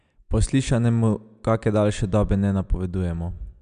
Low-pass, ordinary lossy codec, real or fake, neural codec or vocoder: 9.9 kHz; MP3, 96 kbps; real; none